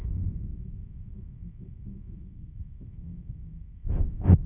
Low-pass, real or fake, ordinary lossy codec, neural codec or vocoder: 3.6 kHz; fake; none; codec, 24 kHz, 0.9 kbps, WavTokenizer, medium music audio release